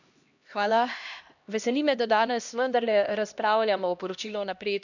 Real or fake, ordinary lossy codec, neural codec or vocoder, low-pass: fake; none; codec, 16 kHz, 1 kbps, X-Codec, HuBERT features, trained on LibriSpeech; 7.2 kHz